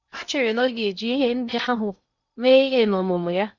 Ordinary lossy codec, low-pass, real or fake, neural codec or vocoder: none; 7.2 kHz; fake; codec, 16 kHz in and 24 kHz out, 0.6 kbps, FocalCodec, streaming, 2048 codes